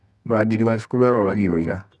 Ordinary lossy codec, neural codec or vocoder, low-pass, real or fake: none; codec, 24 kHz, 0.9 kbps, WavTokenizer, medium music audio release; none; fake